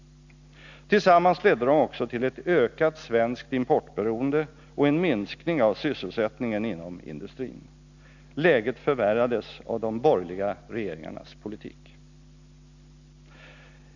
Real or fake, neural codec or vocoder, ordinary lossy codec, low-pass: real; none; none; 7.2 kHz